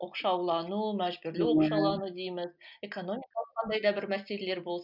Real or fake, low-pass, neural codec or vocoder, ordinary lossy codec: real; 5.4 kHz; none; none